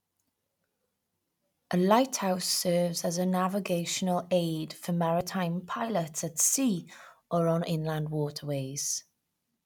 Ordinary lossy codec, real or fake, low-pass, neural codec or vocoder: none; real; 19.8 kHz; none